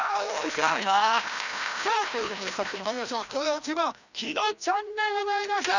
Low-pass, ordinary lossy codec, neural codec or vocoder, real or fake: 7.2 kHz; none; codec, 16 kHz, 1 kbps, FreqCodec, larger model; fake